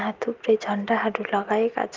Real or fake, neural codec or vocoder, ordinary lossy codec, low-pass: real; none; Opus, 24 kbps; 7.2 kHz